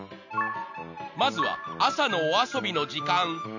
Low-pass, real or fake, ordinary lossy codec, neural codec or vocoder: 7.2 kHz; real; none; none